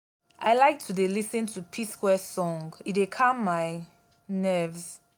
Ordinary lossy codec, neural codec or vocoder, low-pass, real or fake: none; none; none; real